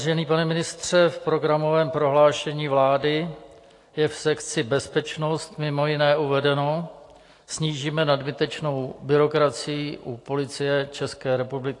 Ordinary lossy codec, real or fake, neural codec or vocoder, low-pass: AAC, 48 kbps; real; none; 10.8 kHz